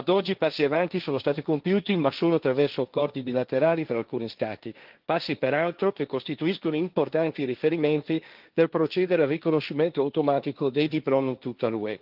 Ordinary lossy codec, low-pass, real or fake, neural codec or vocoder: Opus, 24 kbps; 5.4 kHz; fake; codec, 16 kHz, 1.1 kbps, Voila-Tokenizer